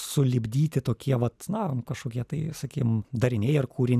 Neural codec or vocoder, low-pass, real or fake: none; 14.4 kHz; real